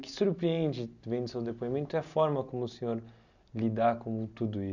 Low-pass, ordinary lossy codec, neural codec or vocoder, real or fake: 7.2 kHz; none; none; real